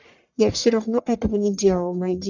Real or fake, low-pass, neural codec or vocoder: fake; 7.2 kHz; codec, 44.1 kHz, 1.7 kbps, Pupu-Codec